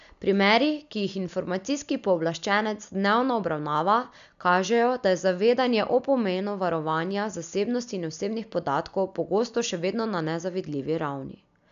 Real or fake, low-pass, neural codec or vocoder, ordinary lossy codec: real; 7.2 kHz; none; AAC, 96 kbps